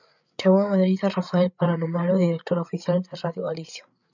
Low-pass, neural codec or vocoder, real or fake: 7.2 kHz; codec, 16 kHz, 8 kbps, FreqCodec, larger model; fake